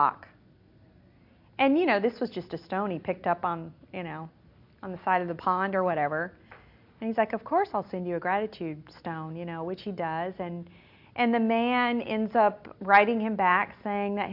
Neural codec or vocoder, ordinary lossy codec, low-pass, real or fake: none; MP3, 48 kbps; 5.4 kHz; real